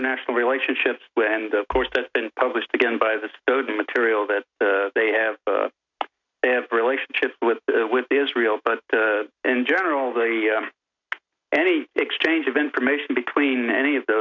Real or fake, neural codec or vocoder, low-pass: real; none; 7.2 kHz